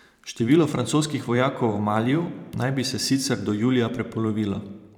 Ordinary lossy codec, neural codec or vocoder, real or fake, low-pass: none; vocoder, 44.1 kHz, 128 mel bands every 512 samples, BigVGAN v2; fake; 19.8 kHz